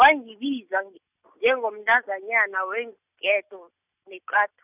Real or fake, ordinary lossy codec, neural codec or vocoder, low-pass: real; none; none; 3.6 kHz